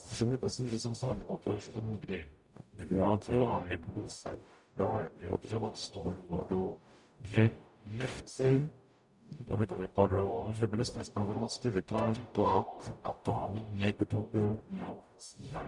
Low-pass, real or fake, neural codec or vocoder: 10.8 kHz; fake; codec, 44.1 kHz, 0.9 kbps, DAC